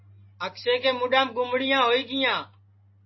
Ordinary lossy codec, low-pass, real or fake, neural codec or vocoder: MP3, 24 kbps; 7.2 kHz; real; none